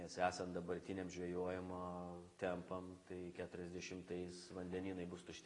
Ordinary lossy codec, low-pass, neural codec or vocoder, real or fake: AAC, 32 kbps; 10.8 kHz; none; real